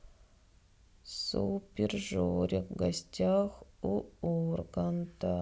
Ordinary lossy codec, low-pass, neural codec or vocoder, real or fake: none; none; none; real